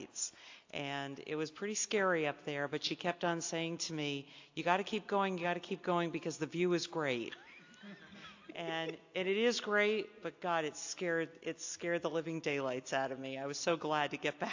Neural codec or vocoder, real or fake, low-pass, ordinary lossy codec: none; real; 7.2 kHz; AAC, 48 kbps